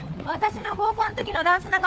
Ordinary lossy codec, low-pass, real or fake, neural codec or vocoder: none; none; fake; codec, 16 kHz, 4 kbps, FunCodec, trained on LibriTTS, 50 frames a second